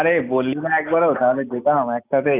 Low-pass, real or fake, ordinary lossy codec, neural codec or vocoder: 3.6 kHz; real; none; none